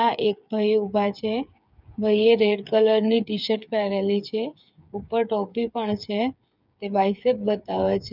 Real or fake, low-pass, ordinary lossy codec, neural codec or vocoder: fake; 5.4 kHz; none; codec, 16 kHz, 8 kbps, FreqCodec, smaller model